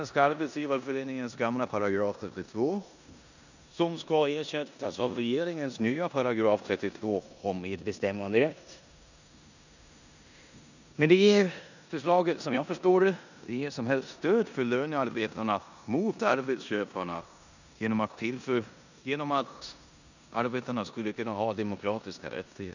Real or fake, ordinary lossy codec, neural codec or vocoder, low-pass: fake; none; codec, 16 kHz in and 24 kHz out, 0.9 kbps, LongCat-Audio-Codec, four codebook decoder; 7.2 kHz